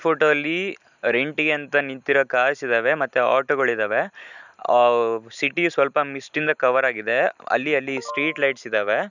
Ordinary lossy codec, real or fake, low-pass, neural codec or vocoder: none; real; 7.2 kHz; none